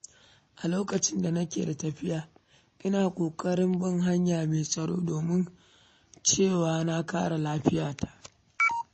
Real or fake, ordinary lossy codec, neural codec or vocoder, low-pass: real; MP3, 32 kbps; none; 10.8 kHz